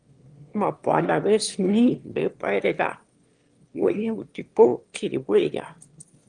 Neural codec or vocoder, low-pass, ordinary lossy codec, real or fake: autoencoder, 22.05 kHz, a latent of 192 numbers a frame, VITS, trained on one speaker; 9.9 kHz; Opus, 24 kbps; fake